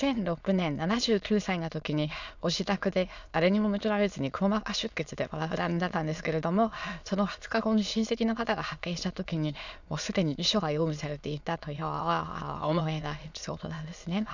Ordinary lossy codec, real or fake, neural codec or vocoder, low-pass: none; fake; autoencoder, 22.05 kHz, a latent of 192 numbers a frame, VITS, trained on many speakers; 7.2 kHz